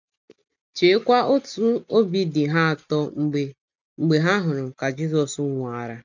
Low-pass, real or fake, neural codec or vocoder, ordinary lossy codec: 7.2 kHz; real; none; none